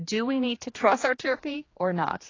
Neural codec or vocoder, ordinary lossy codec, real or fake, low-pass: codec, 16 kHz, 2 kbps, X-Codec, HuBERT features, trained on general audio; AAC, 32 kbps; fake; 7.2 kHz